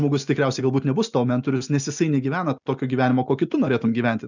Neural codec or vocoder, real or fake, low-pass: none; real; 7.2 kHz